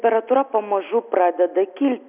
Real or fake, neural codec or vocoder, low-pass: real; none; 3.6 kHz